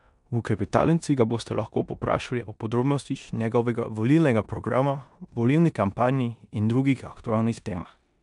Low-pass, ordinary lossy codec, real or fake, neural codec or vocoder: 10.8 kHz; none; fake; codec, 16 kHz in and 24 kHz out, 0.9 kbps, LongCat-Audio-Codec, four codebook decoder